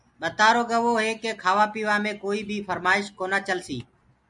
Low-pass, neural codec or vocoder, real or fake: 10.8 kHz; none; real